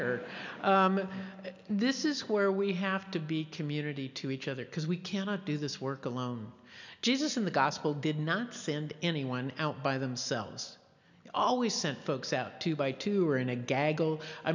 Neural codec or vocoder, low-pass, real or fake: none; 7.2 kHz; real